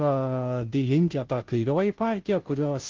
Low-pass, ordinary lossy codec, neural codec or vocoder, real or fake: 7.2 kHz; Opus, 16 kbps; codec, 16 kHz, 0.5 kbps, FunCodec, trained on Chinese and English, 25 frames a second; fake